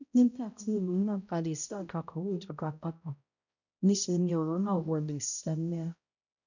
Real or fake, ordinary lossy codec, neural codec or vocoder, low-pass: fake; none; codec, 16 kHz, 0.5 kbps, X-Codec, HuBERT features, trained on balanced general audio; 7.2 kHz